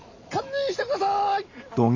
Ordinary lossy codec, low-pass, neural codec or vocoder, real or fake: AAC, 48 kbps; 7.2 kHz; none; real